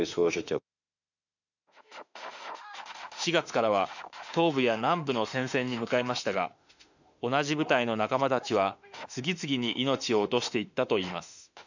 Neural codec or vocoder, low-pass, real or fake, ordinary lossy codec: autoencoder, 48 kHz, 32 numbers a frame, DAC-VAE, trained on Japanese speech; 7.2 kHz; fake; none